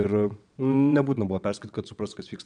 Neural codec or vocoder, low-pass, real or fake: vocoder, 22.05 kHz, 80 mel bands, WaveNeXt; 9.9 kHz; fake